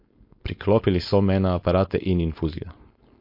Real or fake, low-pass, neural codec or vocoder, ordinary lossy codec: fake; 5.4 kHz; codec, 16 kHz, 4.8 kbps, FACodec; MP3, 32 kbps